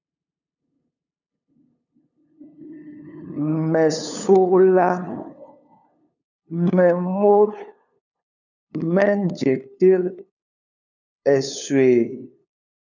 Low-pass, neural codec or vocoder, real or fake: 7.2 kHz; codec, 16 kHz, 2 kbps, FunCodec, trained on LibriTTS, 25 frames a second; fake